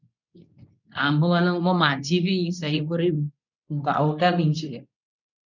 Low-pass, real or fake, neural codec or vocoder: 7.2 kHz; fake; codec, 24 kHz, 0.9 kbps, WavTokenizer, medium speech release version 2